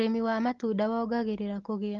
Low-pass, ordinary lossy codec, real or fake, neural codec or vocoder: 7.2 kHz; Opus, 16 kbps; real; none